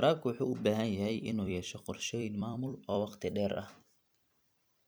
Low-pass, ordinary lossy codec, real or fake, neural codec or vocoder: none; none; fake; vocoder, 44.1 kHz, 128 mel bands every 256 samples, BigVGAN v2